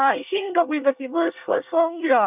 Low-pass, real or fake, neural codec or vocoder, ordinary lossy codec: 3.6 kHz; fake; codec, 24 kHz, 1 kbps, SNAC; none